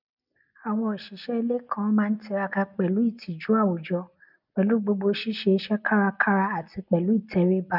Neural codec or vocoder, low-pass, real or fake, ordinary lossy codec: none; 5.4 kHz; real; none